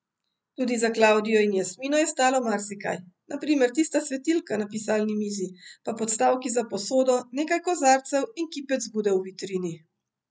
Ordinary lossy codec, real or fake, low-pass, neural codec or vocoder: none; real; none; none